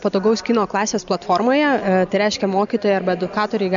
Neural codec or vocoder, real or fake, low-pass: none; real; 7.2 kHz